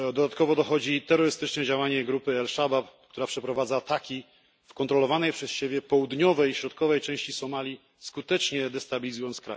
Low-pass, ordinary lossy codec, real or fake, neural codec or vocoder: none; none; real; none